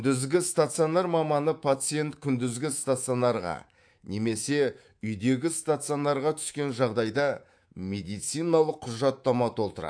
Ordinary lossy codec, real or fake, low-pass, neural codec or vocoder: AAC, 64 kbps; fake; 9.9 kHz; codec, 24 kHz, 3.1 kbps, DualCodec